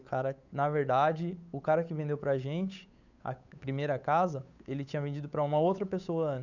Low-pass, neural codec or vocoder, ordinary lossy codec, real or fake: 7.2 kHz; codec, 16 kHz, 8 kbps, FunCodec, trained on Chinese and English, 25 frames a second; none; fake